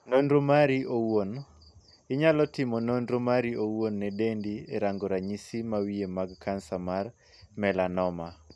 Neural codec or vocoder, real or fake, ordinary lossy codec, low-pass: none; real; none; none